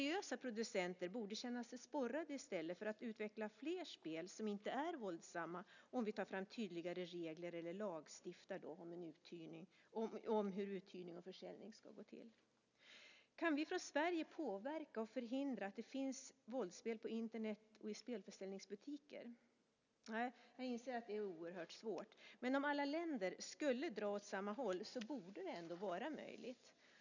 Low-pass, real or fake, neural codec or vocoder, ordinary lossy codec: 7.2 kHz; real; none; none